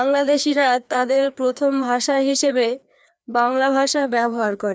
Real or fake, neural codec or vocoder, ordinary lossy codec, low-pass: fake; codec, 16 kHz, 2 kbps, FreqCodec, larger model; none; none